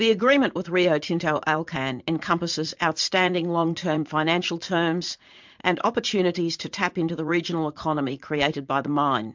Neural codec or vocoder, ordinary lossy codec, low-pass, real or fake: vocoder, 44.1 kHz, 80 mel bands, Vocos; MP3, 64 kbps; 7.2 kHz; fake